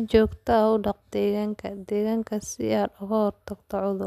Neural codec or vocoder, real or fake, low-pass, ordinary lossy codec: none; real; 14.4 kHz; none